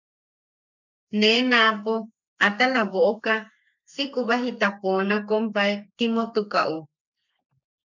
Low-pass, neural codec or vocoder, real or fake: 7.2 kHz; codec, 32 kHz, 1.9 kbps, SNAC; fake